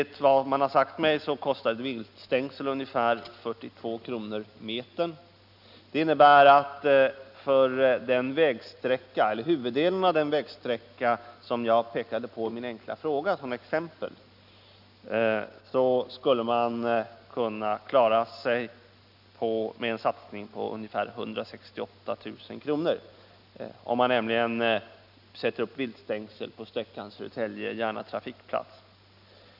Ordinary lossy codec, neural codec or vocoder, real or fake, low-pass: none; none; real; 5.4 kHz